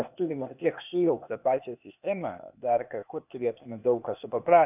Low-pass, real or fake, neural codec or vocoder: 3.6 kHz; fake; codec, 16 kHz, 0.8 kbps, ZipCodec